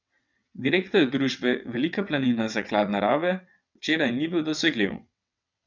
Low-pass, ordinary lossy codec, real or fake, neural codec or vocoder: 7.2 kHz; none; fake; vocoder, 22.05 kHz, 80 mel bands, WaveNeXt